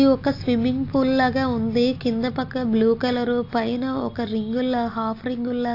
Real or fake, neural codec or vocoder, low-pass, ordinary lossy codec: real; none; 5.4 kHz; AAC, 32 kbps